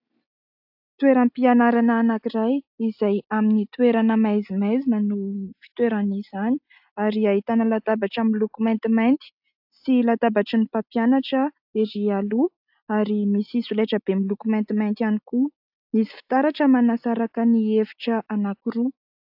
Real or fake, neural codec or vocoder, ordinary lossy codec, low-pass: real; none; AAC, 48 kbps; 5.4 kHz